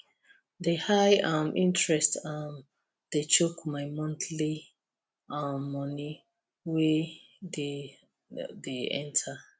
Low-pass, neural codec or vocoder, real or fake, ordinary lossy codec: none; none; real; none